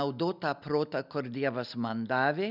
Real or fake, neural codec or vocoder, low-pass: real; none; 5.4 kHz